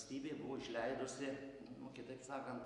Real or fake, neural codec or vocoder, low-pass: real; none; 10.8 kHz